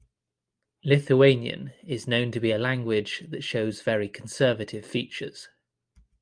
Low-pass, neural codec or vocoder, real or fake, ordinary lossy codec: 9.9 kHz; none; real; Opus, 32 kbps